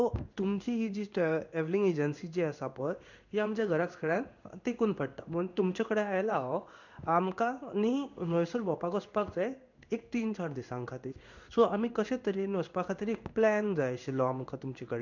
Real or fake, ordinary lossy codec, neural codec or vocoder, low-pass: fake; none; codec, 16 kHz in and 24 kHz out, 1 kbps, XY-Tokenizer; 7.2 kHz